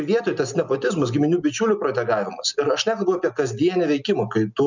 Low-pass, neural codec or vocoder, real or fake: 7.2 kHz; none; real